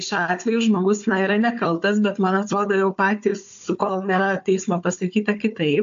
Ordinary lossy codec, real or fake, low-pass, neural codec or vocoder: AAC, 64 kbps; fake; 7.2 kHz; codec, 16 kHz, 4 kbps, FunCodec, trained on Chinese and English, 50 frames a second